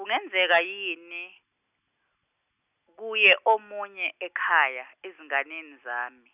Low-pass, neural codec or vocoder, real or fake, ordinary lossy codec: 3.6 kHz; none; real; none